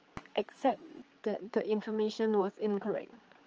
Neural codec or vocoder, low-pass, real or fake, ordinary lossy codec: codec, 16 kHz, 4 kbps, X-Codec, HuBERT features, trained on general audio; 7.2 kHz; fake; Opus, 24 kbps